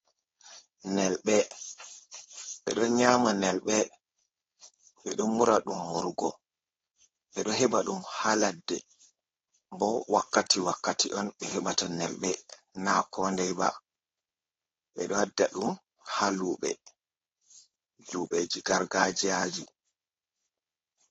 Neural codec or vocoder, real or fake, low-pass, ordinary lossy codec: codec, 16 kHz, 4.8 kbps, FACodec; fake; 7.2 kHz; AAC, 24 kbps